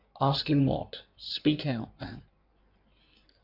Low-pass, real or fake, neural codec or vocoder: 5.4 kHz; fake; codec, 16 kHz in and 24 kHz out, 1.1 kbps, FireRedTTS-2 codec